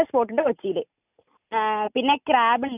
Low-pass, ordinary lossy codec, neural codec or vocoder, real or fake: 3.6 kHz; none; none; real